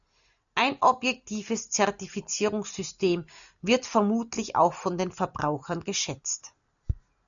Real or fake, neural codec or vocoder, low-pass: real; none; 7.2 kHz